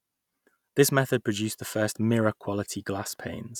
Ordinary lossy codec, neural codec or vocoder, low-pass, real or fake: none; none; 19.8 kHz; real